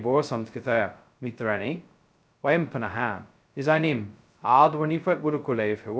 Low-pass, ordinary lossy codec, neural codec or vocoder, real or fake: none; none; codec, 16 kHz, 0.2 kbps, FocalCodec; fake